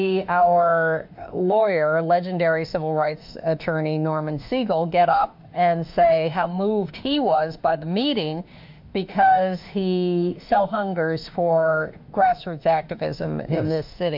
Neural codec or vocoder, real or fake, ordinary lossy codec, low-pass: autoencoder, 48 kHz, 32 numbers a frame, DAC-VAE, trained on Japanese speech; fake; MP3, 48 kbps; 5.4 kHz